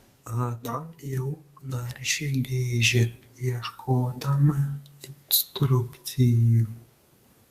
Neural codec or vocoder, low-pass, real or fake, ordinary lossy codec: codec, 32 kHz, 1.9 kbps, SNAC; 14.4 kHz; fake; Opus, 64 kbps